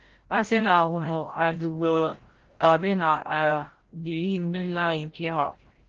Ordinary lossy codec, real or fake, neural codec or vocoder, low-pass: Opus, 16 kbps; fake; codec, 16 kHz, 0.5 kbps, FreqCodec, larger model; 7.2 kHz